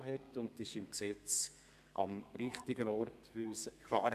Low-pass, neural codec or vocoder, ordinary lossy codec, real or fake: 14.4 kHz; codec, 32 kHz, 1.9 kbps, SNAC; AAC, 96 kbps; fake